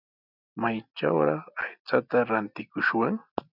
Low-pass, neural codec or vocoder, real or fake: 5.4 kHz; none; real